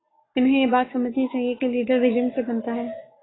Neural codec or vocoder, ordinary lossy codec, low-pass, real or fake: codec, 44.1 kHz, 7.8 kbps, Pupu-Codec; AAC, 16 kbps; 7.2 kHz; fake